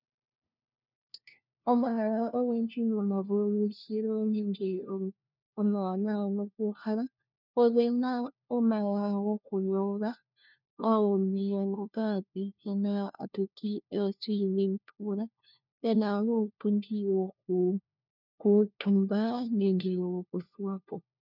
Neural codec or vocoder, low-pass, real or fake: codec, 16 kHz, 1 kbps, FunCodec, trained on LibriTTS, 50 frames a second; 5.4 kHz; fake